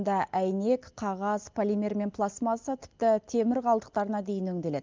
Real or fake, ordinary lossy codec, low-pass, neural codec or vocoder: real; Opus, 32 kbps; 7.2 kHz; none